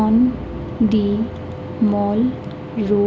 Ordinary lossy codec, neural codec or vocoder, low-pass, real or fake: none; none; none; real